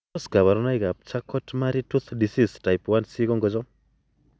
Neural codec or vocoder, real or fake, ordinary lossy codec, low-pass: none; real; none; none